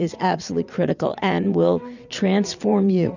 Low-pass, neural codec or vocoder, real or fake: 7.2 kHz; vocoder, 22.05 kHz, 80 mel bands, WaveNeXt; fake